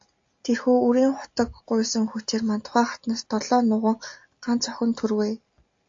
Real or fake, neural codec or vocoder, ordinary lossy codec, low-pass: real; none; AAC, 48 kbps; 7.2 kHz